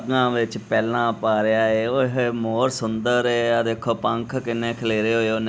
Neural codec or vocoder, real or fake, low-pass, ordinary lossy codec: none; real; none; none